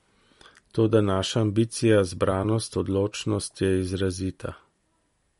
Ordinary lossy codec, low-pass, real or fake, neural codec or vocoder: MP3, 48 kbps; 19.8 kHz; fake; vocoder, 44.1 kHz, 128 mel bands every 256 samples, BigVGAN v2